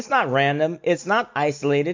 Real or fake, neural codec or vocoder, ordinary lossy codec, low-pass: real; none; MP3, 64 kbps; 7.2 kHz